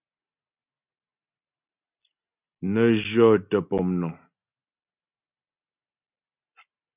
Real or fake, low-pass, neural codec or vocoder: real; 3.6 kHz; none